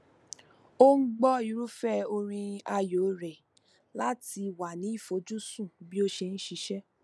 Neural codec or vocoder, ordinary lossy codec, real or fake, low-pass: none; none; real; none